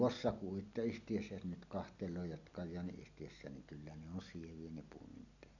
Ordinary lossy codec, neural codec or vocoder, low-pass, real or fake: none; none; 7.2 kHz; real